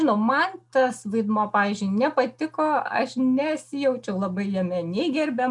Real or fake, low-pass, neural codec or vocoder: real; 10.8 kHz; none